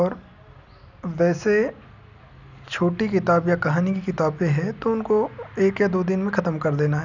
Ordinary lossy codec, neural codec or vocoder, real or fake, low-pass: none; none; real; 7.2 kHz